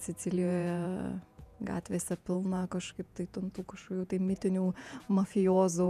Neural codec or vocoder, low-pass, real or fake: vocoder, 48 kHz, 128 mel bands, Vocos; 14.4 kHz; fake